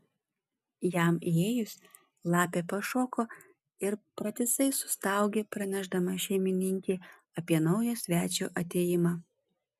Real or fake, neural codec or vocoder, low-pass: real; none; 14.4 kHz